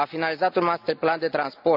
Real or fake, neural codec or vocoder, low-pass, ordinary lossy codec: real; none; 5.4 kHz; none